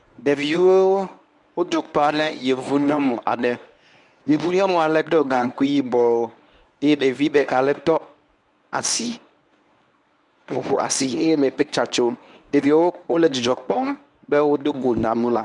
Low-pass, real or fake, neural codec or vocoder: 10.8 kHz; fake; codec, 24 kHz, 0.9 kbps, WavTokenizer, medium speech release version 1